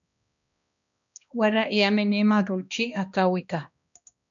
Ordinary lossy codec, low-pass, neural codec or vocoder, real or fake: MP3, 96 kbps; 7.2 kHz; codec, 16 kHz, 1 kbps, X-Codec, HuBERT features, trained on balanced general audio; fake